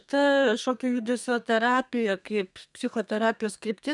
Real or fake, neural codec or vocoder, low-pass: fake; codec, 32 kHz, 1.9 kbps, SNAC; 10.8 kHz